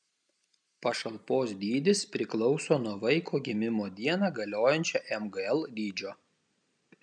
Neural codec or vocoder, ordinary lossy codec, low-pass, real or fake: none; MP3, 96 kbps; 9.9 kHz; real